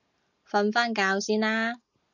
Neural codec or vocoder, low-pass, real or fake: none; 7.2 kHz; real